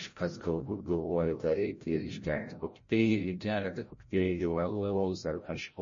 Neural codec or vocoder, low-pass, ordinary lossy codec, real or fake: codec, 16 kHz, 0.5 kbps, FreqCodec, larger model; 7.2 kHz; MP3, 32 kbps; fake